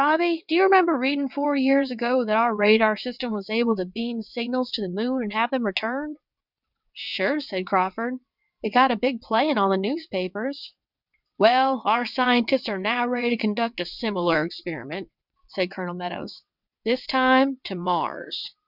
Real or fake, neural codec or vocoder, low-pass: fake; vocoder, 22.05 kHz, 80 mel bands, WaveNeXt; 5.4 kHz